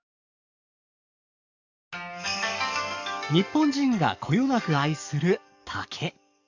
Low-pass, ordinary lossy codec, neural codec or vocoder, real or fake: 7.2 kHz; none; codec, 44.1 kHz, 7.8 kbps, DAC; fake